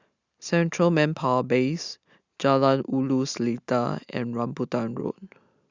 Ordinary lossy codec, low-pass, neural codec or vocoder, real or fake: Opus, 64 kbps; 7.2 kHz; none; real